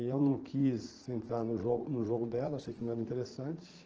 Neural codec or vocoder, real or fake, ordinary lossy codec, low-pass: vocoder, 44.1 kHz, 80 mel bands, Vocos; fake; Opus, 16 kbps; 7.2 kHz